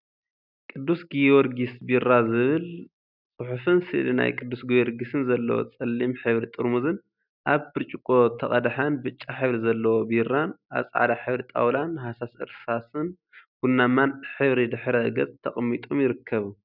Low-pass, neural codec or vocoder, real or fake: 5.4 kHz; none; real